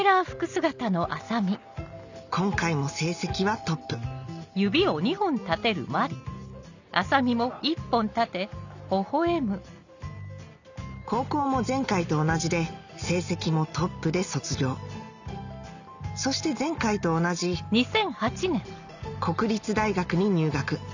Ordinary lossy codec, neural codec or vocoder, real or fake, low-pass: none; none; real; 7.2 kHz